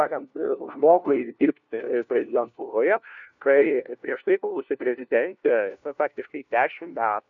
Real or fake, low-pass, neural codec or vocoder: fake; 7.2 kHz; codec, 16 kHz, 1 kbps, FunCodec, trained on LibriTTS, 50 frames a second